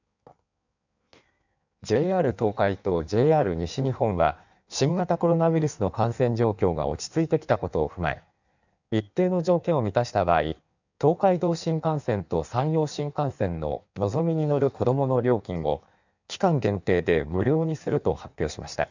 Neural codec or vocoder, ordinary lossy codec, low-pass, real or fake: codec, 16 kHz in and 24 kHz out, 1.1 kbps, FireRedTTS-2 codec; none; 7.2 kHz; fake